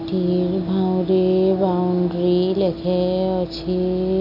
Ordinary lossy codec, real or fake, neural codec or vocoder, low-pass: none; real; none; 5.4 kHz